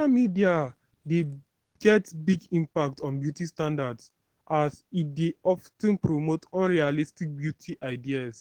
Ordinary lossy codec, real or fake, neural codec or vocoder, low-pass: Opus, 16 kbps; fake; codec, 44.1 kHz, 7.8 kbps, DAC; 19.8 kHz